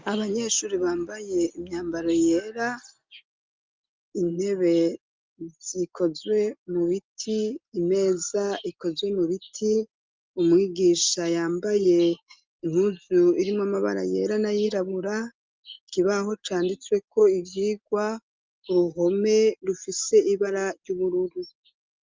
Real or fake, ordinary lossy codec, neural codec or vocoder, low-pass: real; Opus, 24 kbps; none; 7.2 kHz